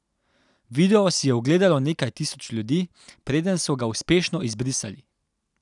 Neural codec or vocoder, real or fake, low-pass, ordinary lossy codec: none; real; 10.8 kHz; none